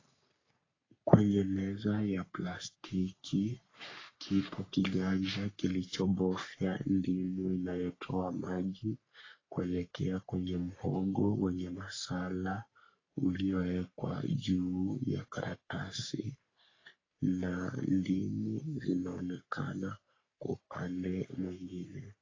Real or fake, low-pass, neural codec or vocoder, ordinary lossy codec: fake; 7.2 kHz; codec, 44.1 kHz, 3.4 kbps, Pupu-Codec; AAC, 32 kbps